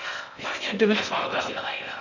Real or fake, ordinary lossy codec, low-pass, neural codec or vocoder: fake; none; 7.2 kHz; codec, 16 kHz in and 24 kHz out, 0.6 kbps, FocalCodec, streaming, 4096 codes